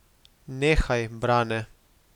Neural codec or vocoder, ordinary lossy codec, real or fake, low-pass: none; none; real; 19.8 kHz